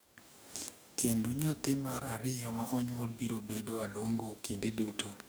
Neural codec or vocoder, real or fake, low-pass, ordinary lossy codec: codec, 44.1 kHz, 2.6 kbps, DAC; fake; none; none